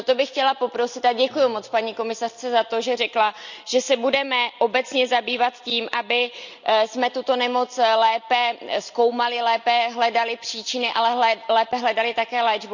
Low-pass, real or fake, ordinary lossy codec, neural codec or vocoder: 7.2 kHz; real; none; none